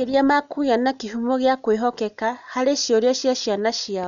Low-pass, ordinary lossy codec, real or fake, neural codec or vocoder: 7.2 kHz; none; real; none